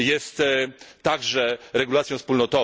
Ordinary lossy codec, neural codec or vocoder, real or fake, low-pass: none; none; real; none